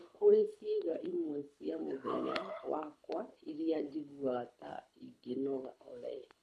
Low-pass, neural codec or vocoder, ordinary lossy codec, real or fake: none; codec, 24 kHz, 6 kbps, HILCodec; none; fake